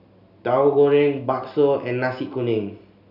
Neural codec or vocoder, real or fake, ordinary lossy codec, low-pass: none; real; none; 5.4 kHz